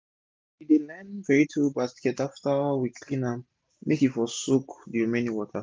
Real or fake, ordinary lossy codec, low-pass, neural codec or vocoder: real; none; none; none